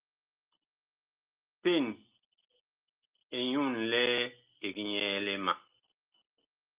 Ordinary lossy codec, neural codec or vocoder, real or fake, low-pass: Opus, 32 kbps; none; real; 3.6 kHz